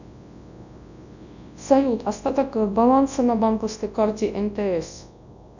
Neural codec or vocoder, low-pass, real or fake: codec, 24 kHz, 0.9 kbps, WavTokenizer, large speech release; 7.2 kHz; fake